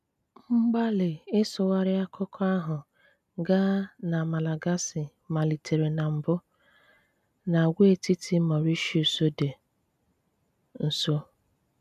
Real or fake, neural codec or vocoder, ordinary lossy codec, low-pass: real; none; none; 14.4 kHz